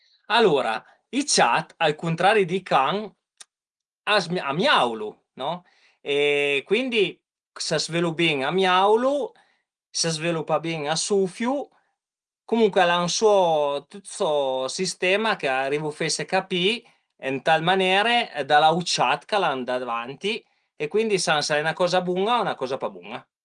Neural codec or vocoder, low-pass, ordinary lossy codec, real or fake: none; 9.9 kHz; Opus, 24 kbps; real